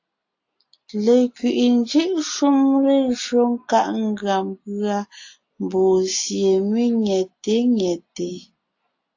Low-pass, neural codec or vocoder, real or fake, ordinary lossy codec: 7.2 kHz; none; real; AAC, 48 kbps